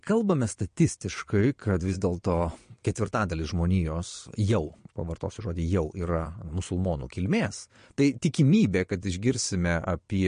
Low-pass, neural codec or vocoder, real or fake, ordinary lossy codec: 9.9 kHz; vocoder, 22.05 kHz, 80 mel bands, Vocos; fake; MP3, 48 kbps